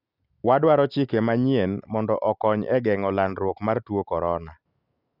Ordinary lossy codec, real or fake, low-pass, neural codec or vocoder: none; real; 5.4 kHz; none